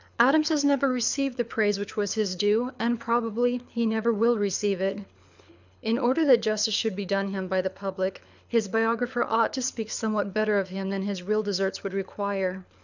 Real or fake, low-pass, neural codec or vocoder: fake; 7.2 kHz; codec, 24 kHz, 6 kbps, HILCodec